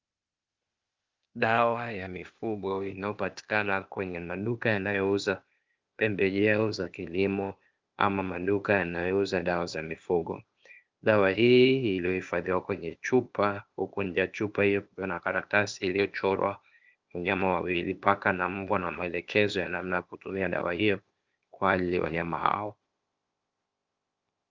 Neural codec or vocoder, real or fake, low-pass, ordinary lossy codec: codec, 16 kHz, 0.8 kbps, ZipCodec; fake; 7.2 kHz; Opus, 24 kbps